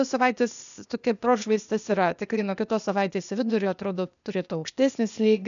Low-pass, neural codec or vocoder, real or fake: 7.2 kHz; codec, 16 kHz, 0.8 kbps, ZipCodec; fake